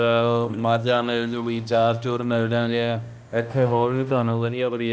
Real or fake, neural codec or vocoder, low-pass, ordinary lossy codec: fake; codec, 16 kHz, 1 kbps, X-Codec, HuBERT features, trained on balanced general audio; none; none